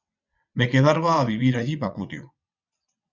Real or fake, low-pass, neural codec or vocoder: fake; 7.2 kHz; vocoder, 22.05 kHz, 80 mel bands, WaveNeXt